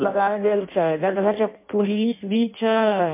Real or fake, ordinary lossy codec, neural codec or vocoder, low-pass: fake; MP3, 24 kbps; codec, 16 kHz in and 24 kHz out, 0.6 kbps, FireRedTTS-2 codec; 3.6 kHz